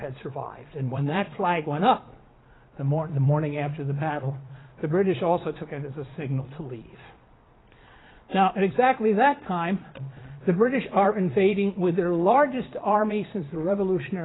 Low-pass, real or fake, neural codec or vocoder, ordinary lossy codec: 7.2 kHz; fake; vocoder, 22.05 kHz, 80 mel bands, Vocos; AAC, 16 kbps